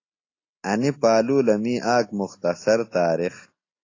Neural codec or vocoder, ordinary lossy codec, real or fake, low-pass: none; AAC, 32 kbps; real; 7.2 kHz